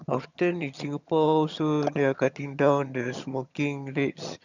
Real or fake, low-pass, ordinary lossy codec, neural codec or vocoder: fake; 7.2 kHz; none; vocoder, 22.05 kHz, 80 mel bands, HiFi-GAN